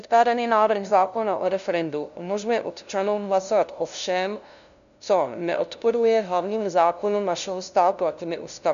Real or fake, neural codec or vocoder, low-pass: fake; codec, 16 kHz, 0.5 kbps, FunCodec, trained on LibriTTS, 25 frames a second; 7.2 kHz